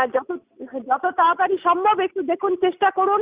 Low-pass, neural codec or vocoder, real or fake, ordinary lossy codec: 3.6 kHz; none; real; Opus, 64 kbps